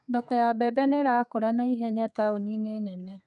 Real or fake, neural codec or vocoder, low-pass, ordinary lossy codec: fake; codec, 32 kHz, 1.9 kbps, SNAC; 10.8 kHz; none